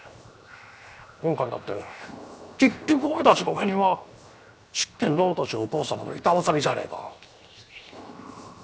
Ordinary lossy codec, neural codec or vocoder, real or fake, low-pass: none; codec, 16 kHz, 0.7 kbps, FocalCodec; fake; none